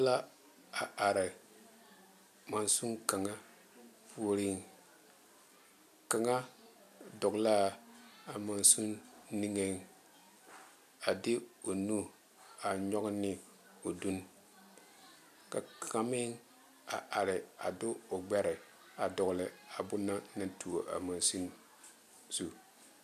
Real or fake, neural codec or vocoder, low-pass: real; none; 14.4 kHz